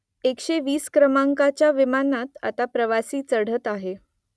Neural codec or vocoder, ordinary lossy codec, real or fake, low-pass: none; none; real; none